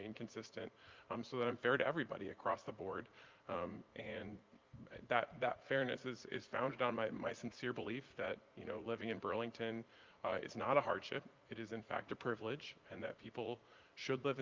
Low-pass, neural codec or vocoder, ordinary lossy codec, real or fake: 7.2 kHz; vocoder, 44.1 kHz, 80 mel bands, Vocos; Opus, 24 kbps; fake